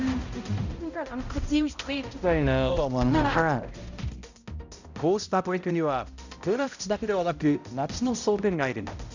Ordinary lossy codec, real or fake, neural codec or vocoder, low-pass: none; fake; codec, 16 kHz, 0.5 kbps, X-Codec, HuBERT features, trained on balanced general audio; 7.2 kHz